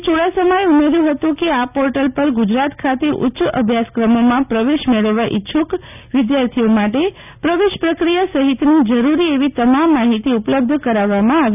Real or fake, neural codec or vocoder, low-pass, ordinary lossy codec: real; none; 3.6 kHz; none